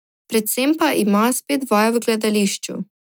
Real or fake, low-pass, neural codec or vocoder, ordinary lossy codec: real; none; none; none